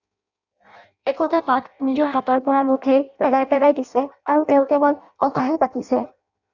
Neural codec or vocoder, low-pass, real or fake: codec, 16 kHz in and 24 kHz out, 0.6 kbps, FireRedTTS-2 codec; 7.2 kHz; fake